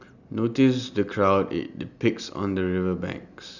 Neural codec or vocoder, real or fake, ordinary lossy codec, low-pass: none; real; none; 7.2 kHz